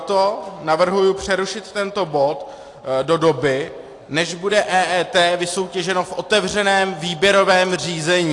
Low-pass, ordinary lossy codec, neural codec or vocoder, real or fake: 10.8 kHz; AAC, 48 kbps; none; real